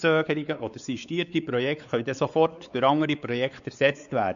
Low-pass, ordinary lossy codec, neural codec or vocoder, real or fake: 7.2 kHz; none; codec, 16 kHz, 4 kbps, X-Codec, WavLM features, trained on Multilingual LibriSpeech; fake